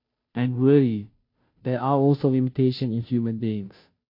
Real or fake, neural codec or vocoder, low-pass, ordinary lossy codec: fake; codec, 16 kHz, 0.5 kbps, FunCodec, trained on Chinese and English, 25 frames a second; 5.4 kHz; MP3, 32 kbps